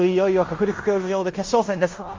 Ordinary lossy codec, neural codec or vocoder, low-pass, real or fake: Opus, 32 kbps; codec, 16 kHz in and 24 kHz out, 0.9 kbps, LongCat-Audio-Codec, fine tuned four codebook decoder; 7.2 kHz; fake